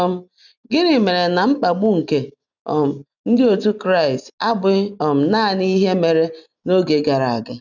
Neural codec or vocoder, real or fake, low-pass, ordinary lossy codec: none; real; 7.2 kHz; none